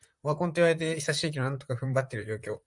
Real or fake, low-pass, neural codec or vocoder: fake; 10.8 kHz; vocoder, 44.1 kHz, 128 mel bands, Pupu-Vocoder